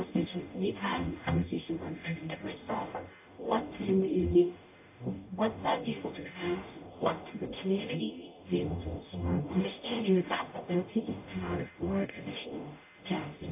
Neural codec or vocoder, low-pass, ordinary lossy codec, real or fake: codec, 44.1 kHz, 0.9 kbps, DAC; 3.6 kHz; AAC, 24 kbps; fake